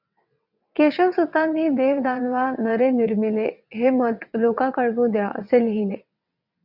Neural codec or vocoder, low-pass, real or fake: vocoder, 22.05 kHz, 80 mel bands, WaveNeXt; 5.4 kHz; fake